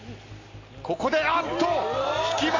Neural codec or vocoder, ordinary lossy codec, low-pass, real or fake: none; AAC, 32 kbps; 7.2 kHz; real